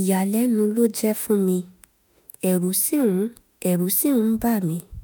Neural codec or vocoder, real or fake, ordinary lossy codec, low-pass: autoencoder, 48 kHz, 32 numbers a frame, DAC-VAE, trained on Japanese speech; fake; none; none